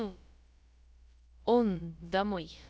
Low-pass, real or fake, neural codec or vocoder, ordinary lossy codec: none; fake; codec, 16 kHz, about 1 kbps, DyCAST, with the encoder's durations; none